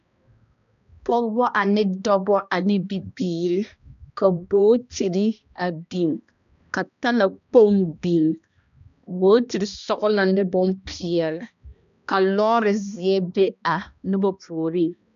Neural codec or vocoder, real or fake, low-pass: codec, 16 kHz, 1 kbps, X-Codec, HuBERT features, trained on balanced general audio; fake; 7.2 kHz